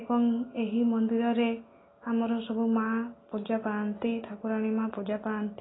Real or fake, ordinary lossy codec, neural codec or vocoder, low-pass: real; AAC, 16 kbps; none; 7.2 kHz